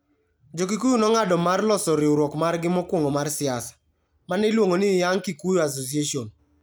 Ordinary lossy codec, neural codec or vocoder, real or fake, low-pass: none; none; real; none